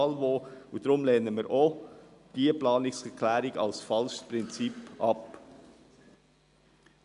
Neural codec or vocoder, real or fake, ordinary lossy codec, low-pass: none; real; none; 10.8 kHz